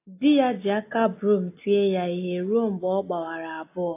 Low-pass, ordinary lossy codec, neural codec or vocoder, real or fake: 3.6 kHz; AAC, 24 kbps; none; real